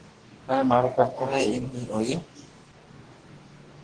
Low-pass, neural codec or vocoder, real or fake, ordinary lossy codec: 9.9 kHz; codec, 44.1 kHz, 2.6 kbps, DAC; fake; Opus, 16 kbps